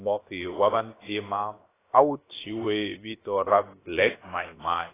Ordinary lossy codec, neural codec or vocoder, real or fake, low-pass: AAC, 16 kbps; codec, 16 kHz, about 1 kbps, DyCAST, with the encoder's durations; fake; 3.6 kHz